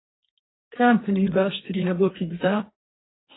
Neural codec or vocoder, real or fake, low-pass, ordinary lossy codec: codec, 32 kHz, 1.9 kbps, SNAC; fake; 7.2 kHz; AAC, 16 kbps